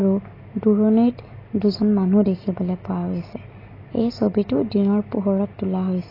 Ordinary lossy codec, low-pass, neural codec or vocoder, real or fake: AAC, 24 kbps; 5.4 kHz; none; real